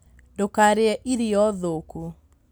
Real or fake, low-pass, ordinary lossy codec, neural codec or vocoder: real; none; none; none